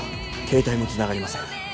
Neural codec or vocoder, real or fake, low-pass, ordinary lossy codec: none; real; none; none